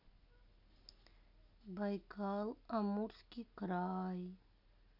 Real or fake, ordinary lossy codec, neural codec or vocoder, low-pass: real; none; none; 5.4 kHz